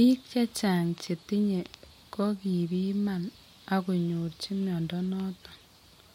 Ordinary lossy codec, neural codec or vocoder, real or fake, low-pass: MP3, 64 kbps; none; real; 19.8 kHz